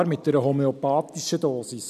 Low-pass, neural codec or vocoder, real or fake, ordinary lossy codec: 14.4 kHz; none; real; none